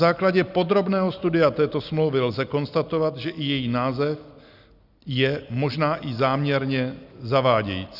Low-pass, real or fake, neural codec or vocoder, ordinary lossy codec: 5.4 kHz; real; none; Opus, 64 kbps